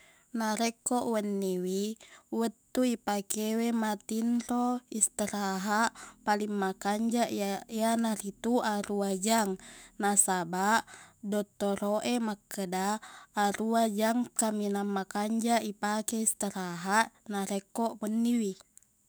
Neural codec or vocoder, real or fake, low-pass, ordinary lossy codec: autoencoder, 48 kHz, 128 numbers a frame, DAC-VAE, trained on Japanese speech; fake; none; none